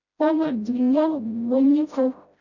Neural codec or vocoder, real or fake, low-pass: codec, 16 kHz, 0.5 kbps, FreqCodec, smaller model; fake; 7.2 kHz